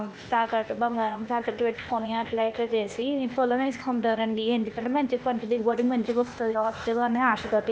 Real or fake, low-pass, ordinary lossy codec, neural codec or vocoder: fake; none; none; codec, 16 kHz, 0.8 kbps, ZipCodec